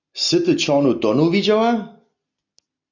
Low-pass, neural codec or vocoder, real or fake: 7.2 kHz; none; real